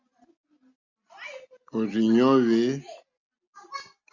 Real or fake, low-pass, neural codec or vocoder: real; 7.2 kHz; none